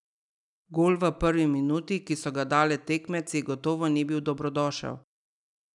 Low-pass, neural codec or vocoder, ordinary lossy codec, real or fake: 10.8 kHz; none; none; real